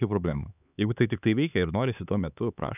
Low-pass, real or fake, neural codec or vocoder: 3.6 kHz; fake; codec, 16 kHz, 4 kbps, X-Codec, HuBERT features, trained on LibriSpeech